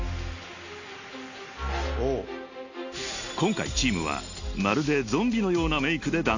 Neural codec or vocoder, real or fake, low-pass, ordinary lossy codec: none; real; 7.2 kHz; AAC, 48 kbps